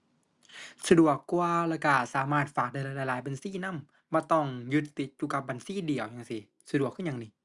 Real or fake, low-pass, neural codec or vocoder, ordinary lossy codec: real; 10.8 kHz; none; Opus, 64 kbps